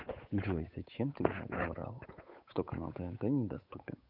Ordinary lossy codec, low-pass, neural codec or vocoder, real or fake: none; 5.4 kHz; codec, 16 kHz, 8 kbps, FunCodec, trained on Chinese and English, 25 frames a second; fake